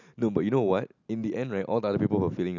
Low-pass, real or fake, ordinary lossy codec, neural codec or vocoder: 7.2 kHz; real; none; none